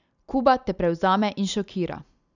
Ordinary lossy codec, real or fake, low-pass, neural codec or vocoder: none; real; 7.2 kHz; none